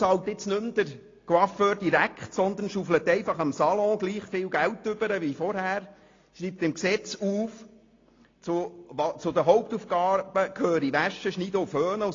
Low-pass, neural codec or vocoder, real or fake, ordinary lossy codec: 7.2 kHz; none; real; AAC, 32 kbps